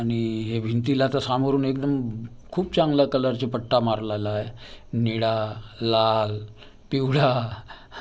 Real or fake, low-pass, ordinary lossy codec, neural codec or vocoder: real; none; none; none